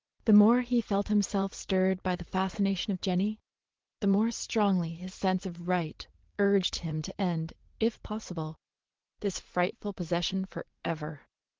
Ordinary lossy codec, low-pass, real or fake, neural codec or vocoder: Opus, 16 kbps; 7.2 kHz; fake; vocoder, 44.1 kHz, 80 mel bands, Vocos